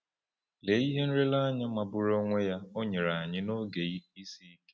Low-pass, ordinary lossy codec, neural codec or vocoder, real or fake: none; none; none; real